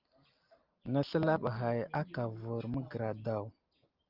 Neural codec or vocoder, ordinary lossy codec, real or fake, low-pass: none; Opus, 24 kbps; real; 5.4 kHz